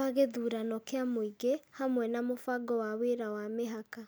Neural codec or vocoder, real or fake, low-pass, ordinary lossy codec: none; real; none; none